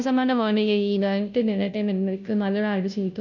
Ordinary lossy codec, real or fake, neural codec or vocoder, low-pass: none; fake; codec, 16 kHz, 0.5 kbps, FunCodec, trained on Chinese and English, 25 frames a second; 7.2 kHz